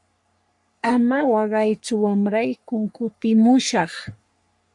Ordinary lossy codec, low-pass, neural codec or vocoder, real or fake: MP3, 64 kbps; 10.8 kHz; codec, 44.1 kHz, 3.4 kbps, Pupu-Codec; fake